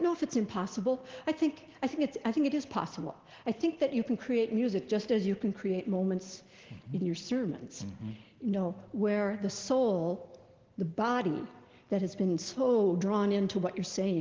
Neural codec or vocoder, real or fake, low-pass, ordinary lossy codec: none; real; 7.2 kHz; Opus, 16 kbps